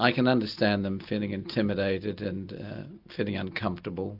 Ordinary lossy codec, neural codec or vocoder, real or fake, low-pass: AAC, 48 kbps; none; real; 5.4 kHz